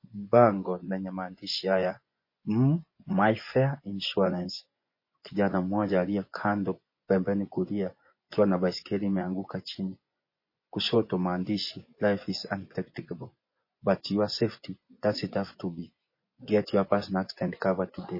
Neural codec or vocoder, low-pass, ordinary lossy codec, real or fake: vocoder, 24 kHz, 100 mel bands, Vocos; 5.4 kHz; MP3, 24 kbps; fake